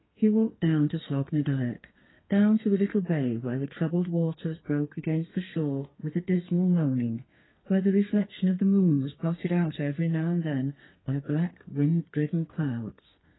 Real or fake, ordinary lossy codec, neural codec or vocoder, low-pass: fake; AAC, 16 kbps; codec, 32 kHz, 1.9 kbps, SNAC; 7.2 kHz